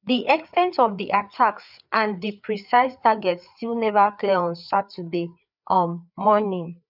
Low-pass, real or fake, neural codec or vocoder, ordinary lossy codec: 5.4 kHz; fake; codec, 16 kHz, 4 kbps, FreqCodec, larger model; none